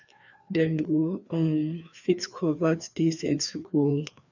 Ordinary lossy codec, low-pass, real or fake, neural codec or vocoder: none; 7.2 kHz; fake; codec, 16 kHz, 2 kbps, FreqCodec, larger model